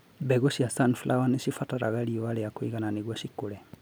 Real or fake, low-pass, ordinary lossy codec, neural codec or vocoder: real; none; none; none